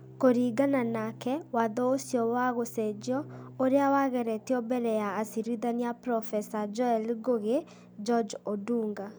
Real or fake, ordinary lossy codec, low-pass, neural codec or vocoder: real; none; none; none